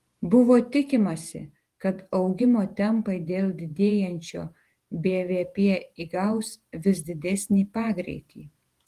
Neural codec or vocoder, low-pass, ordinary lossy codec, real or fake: vocoder, 48 kHz, 128 mel bands, Vocos; 14.4 kHz; Opus, 24 kbps; fake